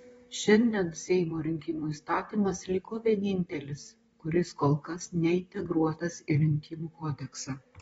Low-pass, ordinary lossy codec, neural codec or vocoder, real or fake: 19.8 kHz; AAC, 24 kbps; vocoder, 44.1 kHz, 128 mel bands, Pupu-Vocoder; fake